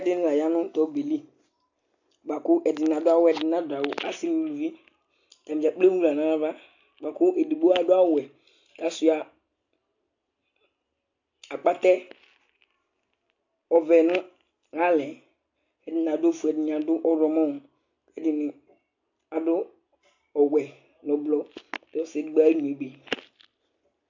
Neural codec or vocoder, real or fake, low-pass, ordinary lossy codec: none; real; 7.2 kHz; AAC, 48 kbps